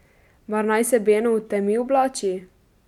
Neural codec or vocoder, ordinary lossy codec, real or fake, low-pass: none; none; real; 19.8 kHz